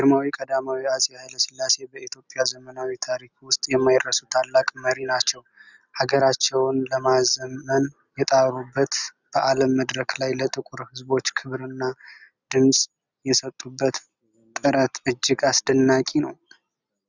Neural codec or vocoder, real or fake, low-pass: none; real; 7.2 kHz